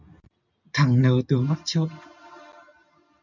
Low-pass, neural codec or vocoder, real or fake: 7.2 kHz; none; real